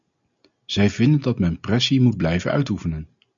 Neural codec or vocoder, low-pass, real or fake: none; 7.2 kHz; real